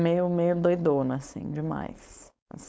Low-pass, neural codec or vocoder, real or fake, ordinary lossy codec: none; codec, 16 kHz, 4.8 kbps, FACodec; fake; none